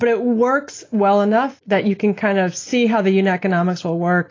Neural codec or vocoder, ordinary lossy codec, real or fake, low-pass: none; AAC, 32 kbps; real; 7.2 kHz